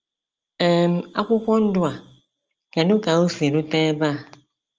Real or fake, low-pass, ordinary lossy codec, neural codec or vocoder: real; 7.2 kHz; Opus, 24 kbps; none